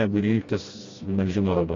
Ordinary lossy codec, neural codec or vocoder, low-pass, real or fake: AAC, 32 kbps; codec, 16 kHz, 1 kbps, FreqCodec, smaller model; 7.2 kHz; fake